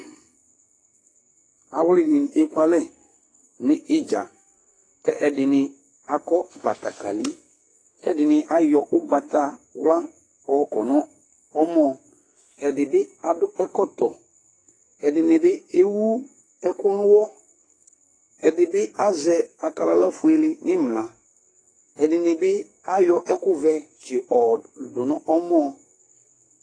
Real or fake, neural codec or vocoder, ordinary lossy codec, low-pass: fake; codec, 44.1 kHz, 2.6 kbps, SNAC; AAC, 32 kbps; 9.9 kHz